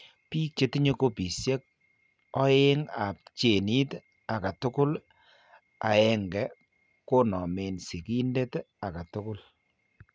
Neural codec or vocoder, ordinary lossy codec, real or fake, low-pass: none; none; real; none